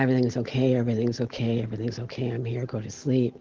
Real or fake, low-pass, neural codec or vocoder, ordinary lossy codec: real; 7.2 kHz; none; Opus, 16 kbps